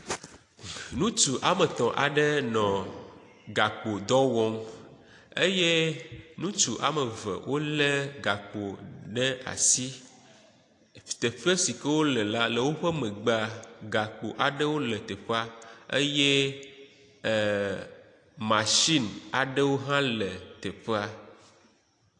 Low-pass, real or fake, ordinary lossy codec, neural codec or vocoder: 10.8 kHz; real; AAC, 48 kbps; none